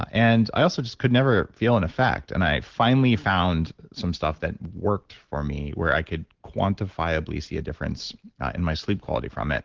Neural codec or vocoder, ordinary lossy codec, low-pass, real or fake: none; Opus, 16 kbps; 7.2 kHz; real